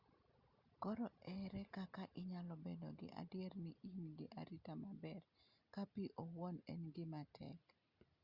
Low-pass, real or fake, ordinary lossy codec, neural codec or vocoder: 5.4 kHz; real; Opus, 64 kbps; none